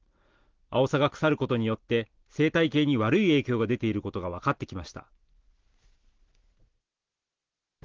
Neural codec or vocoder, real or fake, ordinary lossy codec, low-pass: none; real; Opus, 16 kbps; 7.2 kHz